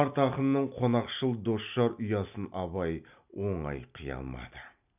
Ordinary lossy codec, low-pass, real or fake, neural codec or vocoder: none; 3.6 kHz; real; none